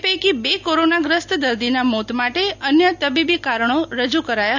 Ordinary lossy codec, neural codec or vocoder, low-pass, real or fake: none; none; 7.2 kHz; real